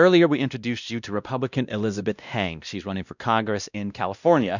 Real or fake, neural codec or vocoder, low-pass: fake; codec, 16 kHz, 1 kbps, X-Codec, WavLM features, trained on Multilingual LibriSpeech; 7.2 kHz